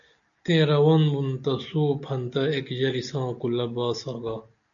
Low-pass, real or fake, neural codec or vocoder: 7.2 kHz; real; none